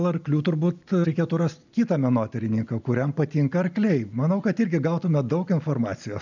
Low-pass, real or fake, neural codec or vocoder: 7.2 kHz; real; none